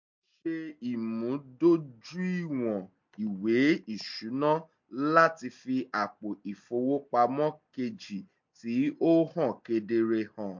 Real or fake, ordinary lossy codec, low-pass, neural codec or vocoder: real; MP3, 48 kbps; 7.2 kHz; none